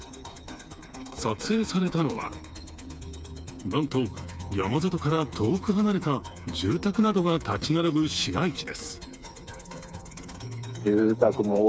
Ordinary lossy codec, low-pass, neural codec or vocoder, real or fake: none; none; codec, 16 kHz, 4 kbps, FreqCodec, smaller model; fake